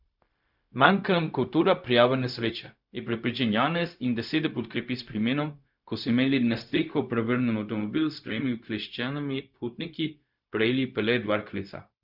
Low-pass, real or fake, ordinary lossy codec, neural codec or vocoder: 5.4 kHz; fake; none; codec, 16 kHz, 0.4 kbps, LongCat-Audio-Codec